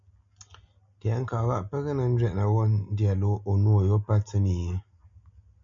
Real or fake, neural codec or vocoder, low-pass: real; none; 7.2 kHz